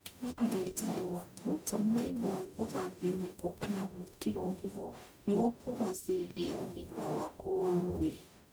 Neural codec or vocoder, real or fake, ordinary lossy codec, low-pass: codec, 44.1 kHz, 0.9 kbps, DAC; fake; none; none